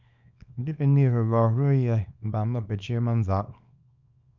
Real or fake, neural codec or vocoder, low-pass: fake; codec, 24 kHz, 0.9 kbps, WavTokenizer, small release; 7.2 kHz